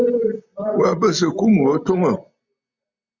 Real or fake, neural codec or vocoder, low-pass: real; none; 7.2 kHz